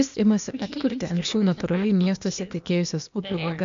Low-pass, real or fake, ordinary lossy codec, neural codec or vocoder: 7.2 kHz; fake; MP3, 64 kbps; codec, 16 kHz, 0.8 kbps, ZipCodec